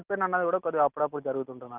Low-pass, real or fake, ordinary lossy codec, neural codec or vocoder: 3.6 kHz; real; Opus, 24 kbps; none